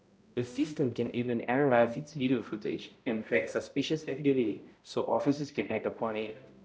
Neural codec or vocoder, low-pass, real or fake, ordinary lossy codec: codec, 16 kHz, 0.5 kbps, X-Codec, HuBERT features, trained on balanced general audio; none; fake; none